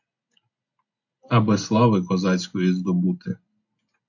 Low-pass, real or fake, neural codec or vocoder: 7.2 kHz; real; none